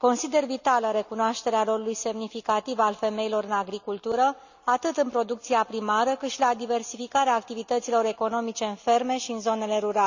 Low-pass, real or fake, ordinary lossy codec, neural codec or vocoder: 7.2 kHz; real; none; none